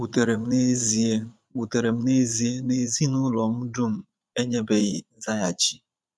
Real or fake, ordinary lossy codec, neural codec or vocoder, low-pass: fake; none; vocoder, 22.05 kHz, 80 mel bands, Vocos; none